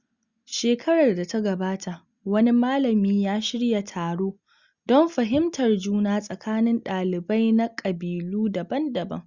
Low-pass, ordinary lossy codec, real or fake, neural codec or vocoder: 7.2 kHz; Opus, 64 kbps; real; none